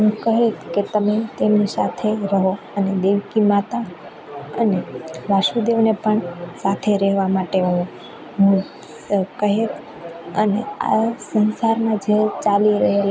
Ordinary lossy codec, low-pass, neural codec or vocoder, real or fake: none; none; none; real